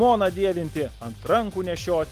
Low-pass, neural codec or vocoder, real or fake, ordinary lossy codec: 14.4 kHz; none; real; Opus, 24 kbps